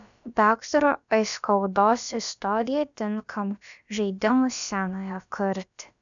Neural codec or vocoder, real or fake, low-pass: codec, 16 kHz, about 1 kbps, DyCAST, with the encoder's durations; fake; 7.2 kHz